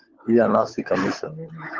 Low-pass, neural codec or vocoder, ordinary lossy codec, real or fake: 7.2 kHz; codec, 16 kHz, 16 kbps, FunCodec, trained on LibriTTS, 50 frames a second; Opus, 16 kbps; fake